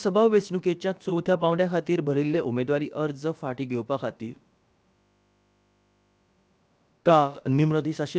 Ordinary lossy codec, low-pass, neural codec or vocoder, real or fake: none; none; codec, 16 kHz, about 1 kbps, DyCAST, with the encoder's durations; fake